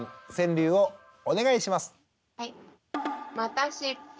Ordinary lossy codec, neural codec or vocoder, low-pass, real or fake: none; none; none; real